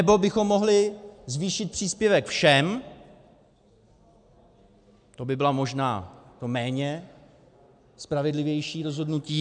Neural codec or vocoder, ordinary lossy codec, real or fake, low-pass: none; MP3, 96 kbps; real; 9.9 kHz